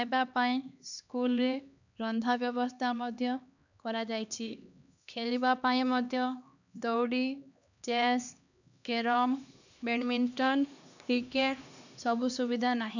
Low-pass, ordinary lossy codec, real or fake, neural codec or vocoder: 7.2 kHz; none; fake; codec, 16 kHz, 2 kbps, X-Codec, HuBERT features, trained on LibriSpeech